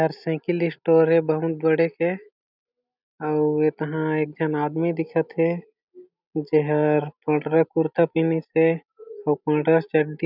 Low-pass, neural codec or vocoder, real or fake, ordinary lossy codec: 5.4 kHz; none; real; none